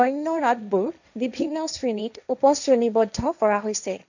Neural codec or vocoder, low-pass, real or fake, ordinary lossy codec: codec, 16 kHz, 1.1 kbps, Voila-Tokenizer; 7.2 kHz; fake; none